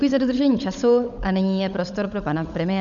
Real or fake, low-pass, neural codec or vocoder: fake; 7.2 kHz; codec, 16 kHz, 16 kbps, FunCodec, trained on LibriTTS, 50 frames a second